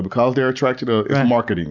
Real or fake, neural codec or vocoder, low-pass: real; none; 7.2 kHz